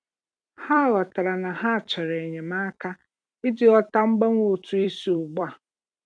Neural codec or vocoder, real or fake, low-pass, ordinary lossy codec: vocoder, 44.1 kHz, 128 mel bands every 256 samples, BigVGAN v2; fake; 9.9 kHz; none